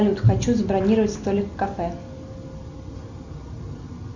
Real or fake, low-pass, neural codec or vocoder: real; 7.2 kHz; none